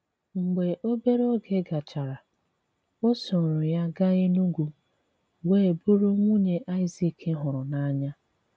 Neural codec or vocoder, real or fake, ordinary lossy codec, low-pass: none; real; none; none